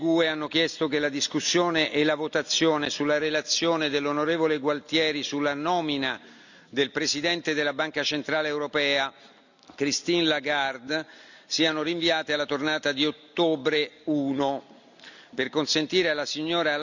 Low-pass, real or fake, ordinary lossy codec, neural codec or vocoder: 7.2 kHz; real; none; none